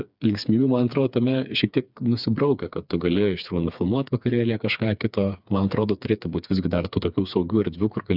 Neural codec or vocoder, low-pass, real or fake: codec, 16 kHz, 4 kbps, FreqCodec, smaller model; 5.4 kHz; fake